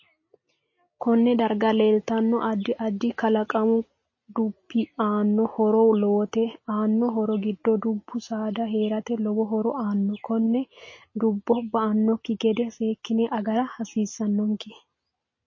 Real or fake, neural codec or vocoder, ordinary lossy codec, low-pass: real; none; MP3, 32 kbps; 7.2 kHz